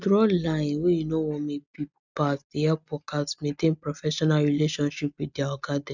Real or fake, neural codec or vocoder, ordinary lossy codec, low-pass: real; none; none; 7.2 kHz